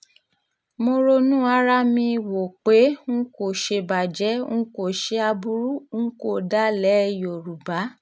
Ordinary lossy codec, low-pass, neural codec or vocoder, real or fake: none; none; none; real